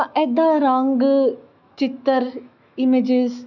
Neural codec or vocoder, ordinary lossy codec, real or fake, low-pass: autoencoder, 48 kHz, 128 numbers a frame, DAC-VAE, trained on Japanese speech; none; fake; 7.2 kHz